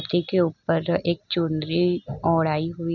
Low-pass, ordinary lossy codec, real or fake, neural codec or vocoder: 7.2 kHz; none; real; none